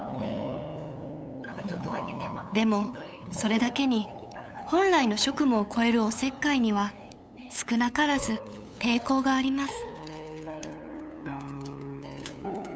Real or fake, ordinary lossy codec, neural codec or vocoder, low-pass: fake; none; codec, 16 kHz, 8 kbps, FunCodec, trained on LibriTTS, 25 frames a second; none